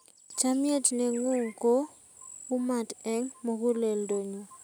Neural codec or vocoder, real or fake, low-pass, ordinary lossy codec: none; real; none; none